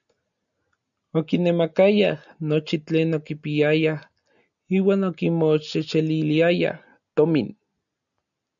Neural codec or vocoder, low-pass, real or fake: none; 7.2 kHz; real